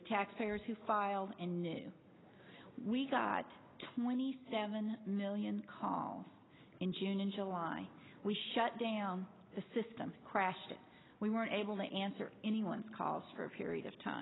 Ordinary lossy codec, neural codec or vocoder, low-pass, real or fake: AAC, 16 kbps; none; 7.2 kHz; real